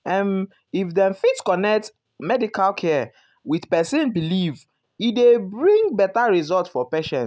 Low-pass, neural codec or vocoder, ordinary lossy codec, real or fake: none; none; none; real